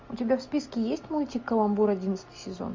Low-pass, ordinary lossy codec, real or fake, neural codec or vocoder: 7.2 kHz; MP3, 48 kbps; real; none